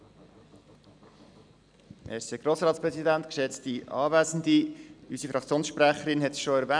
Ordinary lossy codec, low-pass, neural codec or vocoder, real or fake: none; 9.9 kHz; none; real